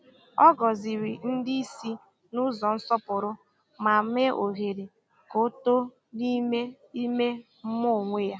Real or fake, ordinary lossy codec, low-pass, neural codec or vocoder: real; none; none; none